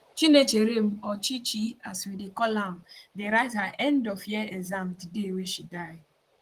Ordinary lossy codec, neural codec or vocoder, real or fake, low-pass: Opus, 24 kbps; vocoder, 44.1 kHz, 128 mel bands, Pupu-Vocoder; fake; 14.4 kHz